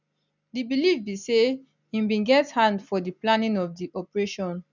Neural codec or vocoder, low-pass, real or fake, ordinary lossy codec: none; 7.2 kHz; real; none